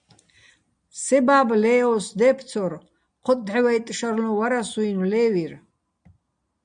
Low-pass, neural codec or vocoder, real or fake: 9.9 kHz; none; real